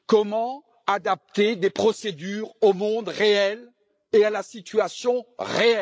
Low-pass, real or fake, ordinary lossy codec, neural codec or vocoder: none; fake; none; codec, 16 kHz, 16 kbps, FreqCodec, larger model